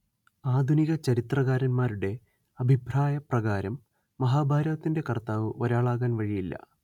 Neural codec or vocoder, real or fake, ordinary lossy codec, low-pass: none; real; none; 19.8 kHz